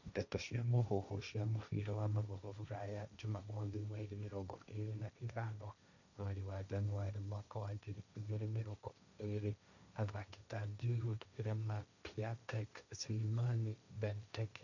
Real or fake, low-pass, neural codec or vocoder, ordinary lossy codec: fake; 7.2 kHz; codec, 16 kHz, 1.1 kbps, Voila-Tokenizer; none